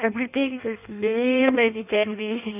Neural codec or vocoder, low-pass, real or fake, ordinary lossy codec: codec, 16 kHz in and 24 kHz out, 0.6 kbps, FireRedTTS-2 codec; 3.6 kHz; fake; none